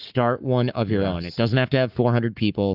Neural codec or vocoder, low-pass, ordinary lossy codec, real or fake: codec, 44.1 kHz, 7.8 kbps, Pupu-Codec; 5.4 kHz; Opus, 24 kbps; fake